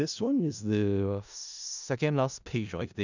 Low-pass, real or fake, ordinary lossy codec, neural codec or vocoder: 7.2 kHz; fake; none; codec, 16 kHz in and 24 kHz out, 0.4 kbps, LongCat-Audio-Codec, four codebook decoder